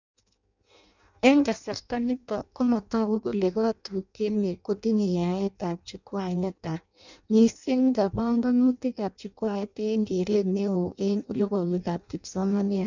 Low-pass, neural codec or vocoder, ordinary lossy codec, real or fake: 7.2 kHz; codec, 16 kHz in and 24 kHz out, 0.6 kbps, FireRedTTS-2 codec; none; fake